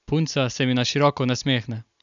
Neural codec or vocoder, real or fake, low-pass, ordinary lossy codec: none; real; 7.2 kHz; none